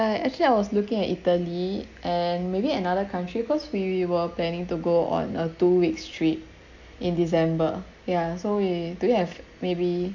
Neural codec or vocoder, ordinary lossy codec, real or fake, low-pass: none; none; real; 7.2 kHz